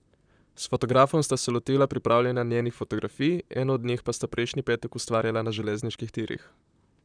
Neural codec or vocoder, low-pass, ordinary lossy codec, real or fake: vocoder, 44.1 kHz, 128 mel bands, Pupu-Vocoder; 9.9 kHz; none; fake